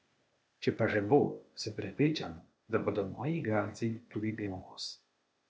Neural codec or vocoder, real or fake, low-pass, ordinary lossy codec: codec, 16 kHz, 0.8 kbps, ZipCodec; fake; none; none